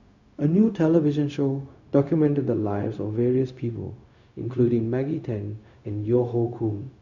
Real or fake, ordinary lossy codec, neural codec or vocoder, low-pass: fake; none; codec, 16 kHz, 0.4 kbps, LongCat-Audio-Codec; 7.2 kHz